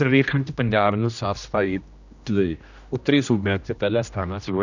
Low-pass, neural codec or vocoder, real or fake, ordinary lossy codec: 7.2 kHz; codec, 16 kHz, 1 kbps, X-Codec, HuBERT features, trained on general audio; fake; none